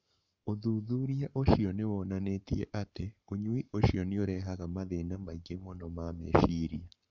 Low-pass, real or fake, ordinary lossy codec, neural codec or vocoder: 7.2 kHz; fake; none; codec, 44.1 kHz, 7.8 kbps, Pupu-Codec